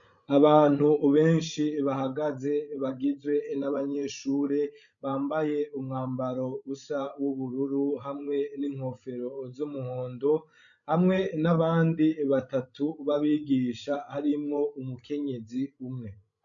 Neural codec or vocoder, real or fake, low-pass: codec, 16 kHz, 16 kbps, FreqCodec, larger model; fake; 7.2 kHz